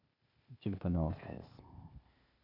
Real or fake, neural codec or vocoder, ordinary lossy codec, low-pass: fake; codec, 16 kHz, 0.8 kbps, ZipCodec; none; 5.4 kHz